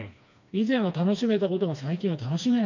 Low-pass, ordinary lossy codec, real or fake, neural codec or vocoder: 7.2 kHz; none; fake; codec, 16 kHz, 2 kbps, FreqCodec, smaller model